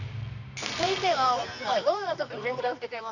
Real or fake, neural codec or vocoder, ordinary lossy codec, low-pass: fake; codec, 24 kHz, 0.9 kbps, WavTokenizer, medium music audio release; none; 7.2 kHz